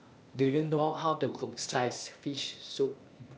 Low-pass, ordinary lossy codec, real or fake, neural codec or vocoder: none; none; fake; codec, 16 kHz, 0.8 kbps, ZipCodec